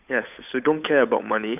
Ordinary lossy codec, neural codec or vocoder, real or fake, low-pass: none; codec, 16 kHz, 8 kbps, FunCodec, trained on Chinese and English, 25 frames a second; fake; 3.6 kHz